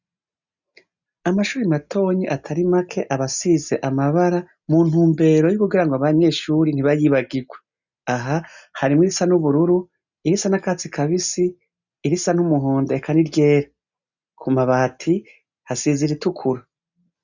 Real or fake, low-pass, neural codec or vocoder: real; 7.2 kHz; none